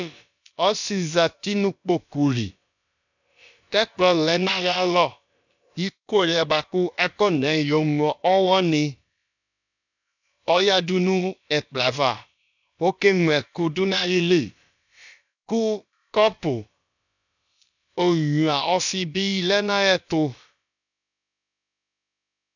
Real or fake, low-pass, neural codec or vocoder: fake; 7.2 kHz; codec, 16 kHz, about 1 kbps, DyCAST, with the encoder's durations